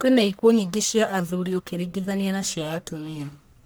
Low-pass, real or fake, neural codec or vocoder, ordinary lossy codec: none; fake; codec, 44.1 kHz, 1.7 kbps, Pupu-Codec; none